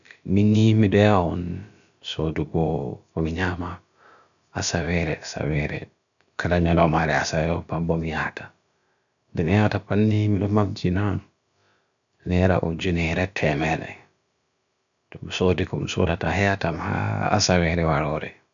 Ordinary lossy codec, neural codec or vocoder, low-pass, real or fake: none; codec, 16 kHz, about 1 kbps, DyCAST, with the encoder's durations; 7.2 kHz; fake